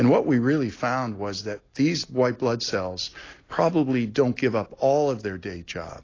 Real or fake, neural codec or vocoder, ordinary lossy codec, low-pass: real; none; AAC, 32 kbps; 7.2 kHz